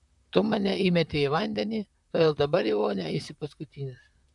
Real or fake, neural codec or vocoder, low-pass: fake; vocoder, 44.1 kHz, 128 mel bands, Pupu-Vocoder; 10.8 kHz